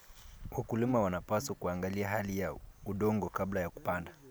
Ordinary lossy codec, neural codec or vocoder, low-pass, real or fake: none; none; none; real